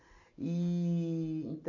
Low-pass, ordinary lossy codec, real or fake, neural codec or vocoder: 7.2 kHz; none; real; none